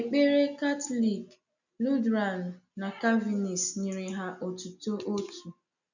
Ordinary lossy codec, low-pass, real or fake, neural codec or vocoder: none; 7.2 kHz; real; none